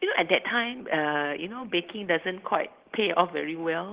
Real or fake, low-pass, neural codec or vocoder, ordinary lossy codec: real; 3.6 kHz; none; Opus, 16 kbps